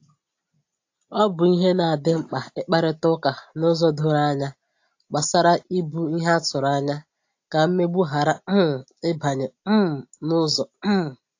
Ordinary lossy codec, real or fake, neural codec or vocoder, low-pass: AAC, 48 kbps; real; none; 7.2 kHz